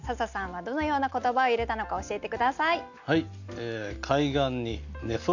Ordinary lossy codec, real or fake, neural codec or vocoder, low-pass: none; real; none; 7.2 kHz